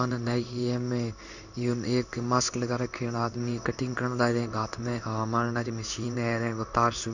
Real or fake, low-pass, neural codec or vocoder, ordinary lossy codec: fake; 7.2 kHz; codec, 16 kHz in and 24 kHz out, 1 kbps, XY-Tokenizer; none